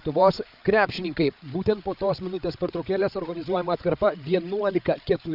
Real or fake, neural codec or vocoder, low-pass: fake; codec, 16 kHz, 8 kbps, FreqCodec, larger model; 5.4 kHz